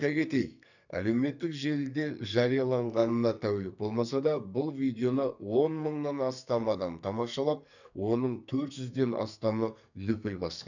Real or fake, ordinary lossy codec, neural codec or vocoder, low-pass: fake; none; codec, 44.1 kHz, 2.6 kbps, SNAC; 7.2 kHz